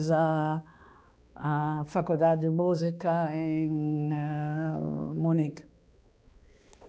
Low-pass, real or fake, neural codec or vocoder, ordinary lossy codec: none; fake; codec, 16 kHz, 2 kbps, X-Codec, HuBERT features, trained on balanced general audio; none